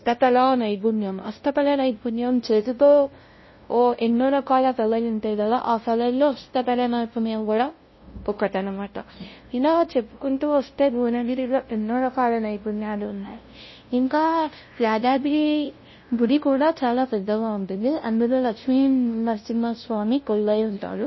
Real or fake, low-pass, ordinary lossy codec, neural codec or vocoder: fake; 7.2 kHz; MP3, 24 kbps; codec, 16 kHz, 0.5 kbps, FunCodec, trained on LibriTTS, 25 frames a second